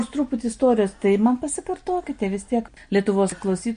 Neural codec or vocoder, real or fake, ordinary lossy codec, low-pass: none; real; MP3, 48 kbps; 9.9 kHz